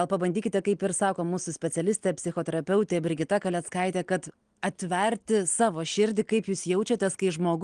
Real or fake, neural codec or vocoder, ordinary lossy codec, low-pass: real; none; Opus, 24 kbps; 9.9 kHz